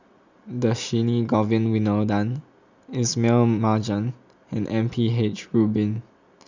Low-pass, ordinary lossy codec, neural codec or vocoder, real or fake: 7.2 kHz; Opus, 64 kbps; none; real